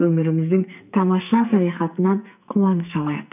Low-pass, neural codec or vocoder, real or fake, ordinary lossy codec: 3.6 kHz; codec, 44.1 kHz, 2.6 kbps, SNAC; fake; none